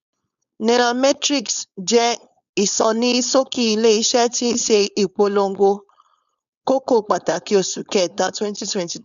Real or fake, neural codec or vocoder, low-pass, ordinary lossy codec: fake; codec, 16 kHz, 4.8 kbps, FACodec; 7.2 kHz; none